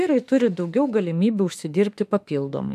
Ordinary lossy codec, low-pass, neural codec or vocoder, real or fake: MP3, 96 kbps; 14.4 kHz; autoencoder, 48 kHz, 32 numbers a frame, DAC-VAE, trained on Japanese speech; fake